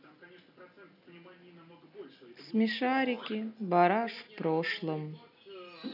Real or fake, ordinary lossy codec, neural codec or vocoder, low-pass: real; none; none; 5.4 kHz